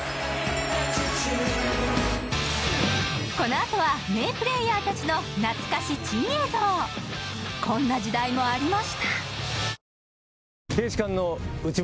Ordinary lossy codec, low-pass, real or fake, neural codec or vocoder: none; none; real; none